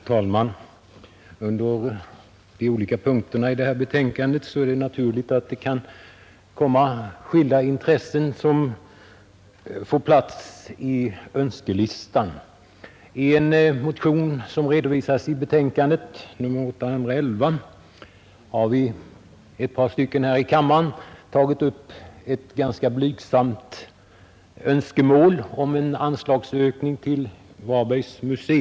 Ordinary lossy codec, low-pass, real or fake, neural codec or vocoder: none; none; real; none